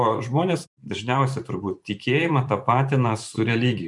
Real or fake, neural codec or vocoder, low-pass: fake; vocoder, 44.1 kHz, 128 mel bands every 512 samples, BigVGAN v2; 10.8 kHz